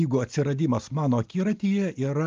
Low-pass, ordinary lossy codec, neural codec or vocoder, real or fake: 7.2 kHz; Opus, 32 kbps; none; real